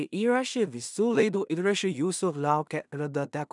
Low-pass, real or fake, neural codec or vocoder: 10.8 kHz; fake; codec, 16 kHz in and 24 kHz out, 0.4 kbps, LongCat-Audio-Codec, two codebook decoder